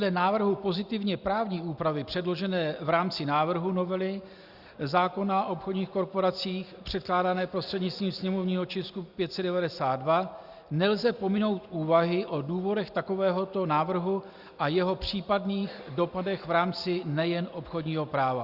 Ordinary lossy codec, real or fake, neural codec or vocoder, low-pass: Opus, 64 kbps; real; none; 5.4 kHz